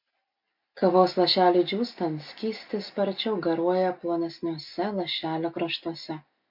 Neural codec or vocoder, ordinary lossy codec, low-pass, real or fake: none; MP3, 48 kbps; 5.4 kHz; real